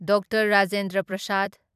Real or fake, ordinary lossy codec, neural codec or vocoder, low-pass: fake; none; vocoder, 44.1 kHz, 128 mel bands every 256 samples, BigVGAN v2; 14.4 kHz